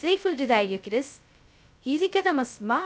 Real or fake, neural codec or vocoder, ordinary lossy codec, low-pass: fake; codec, 16 kHz, 0.2 kbps, FocalCodec; none; none